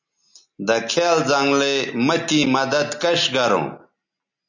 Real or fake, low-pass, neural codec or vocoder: real; 7.2 kHz; none